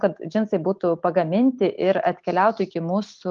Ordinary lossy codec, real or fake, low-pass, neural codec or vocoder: Opus, 32 kbps; real; 7.2 kHz; none